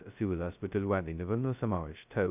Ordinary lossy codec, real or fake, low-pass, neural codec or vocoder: none; fake; 3.6 kHz; codec, 16 kHz, 0.2 kbps, FocalCodec